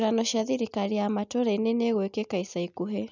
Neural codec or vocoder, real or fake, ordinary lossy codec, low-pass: none; real; none; 7.2 kHz